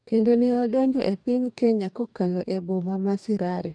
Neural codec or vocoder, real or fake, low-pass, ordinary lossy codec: codec, 44.1 kHz, 2.6 kbps, DAC; fake; 9.9 kHz; none